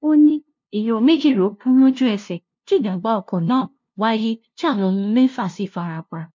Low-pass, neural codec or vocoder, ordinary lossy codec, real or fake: 7.2 kHz; codec, 16 kHz, 0.5 kbps, FunCodec, trained on LibriTTS, 25 frames a second; MP3, 48 kbps; fake